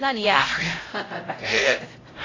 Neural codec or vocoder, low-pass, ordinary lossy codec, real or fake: codec, 16 kHz, 0.5 kbps, X-Codec, HuBERT features, trained on LibriSpeech; 7.2 kHz; AAC, 32 kbps; fake